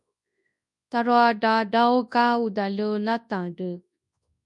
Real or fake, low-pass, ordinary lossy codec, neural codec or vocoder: fake; 10.8 kHz; MP3, 96 kbps; codec, 24 kHz, 0.9 kbps, WavTokenizer, large speech release